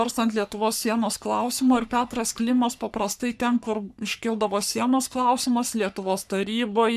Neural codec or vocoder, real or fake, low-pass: codec, 44.1 kHz, 3.4 kbps, Pupu-Codec; fake; 14.4 kHz